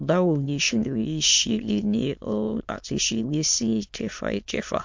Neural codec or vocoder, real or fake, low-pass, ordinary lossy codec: autoencoder, 22.05 kHz, a latent of 192 numbers a frame, VITS, trained on many speakers; fake; 7.2 kHz; MP3, 48 kbps